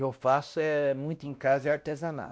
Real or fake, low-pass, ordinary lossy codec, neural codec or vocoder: fake; none; none; codec, 16 kHz, 1 kbps, X-Codec, WavLM features, trained on Multilingual LibriSpeech